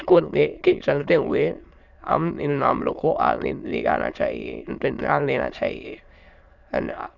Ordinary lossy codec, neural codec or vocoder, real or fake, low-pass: none; autoencoder, 22.05 kHz, a latent of 192 numbers a frame, VITS, trained on many speakers; fake; 7.2 kHz